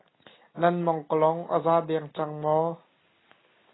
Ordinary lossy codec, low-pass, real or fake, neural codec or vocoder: AAC, 16 kbps; 7.2 kHz; real; none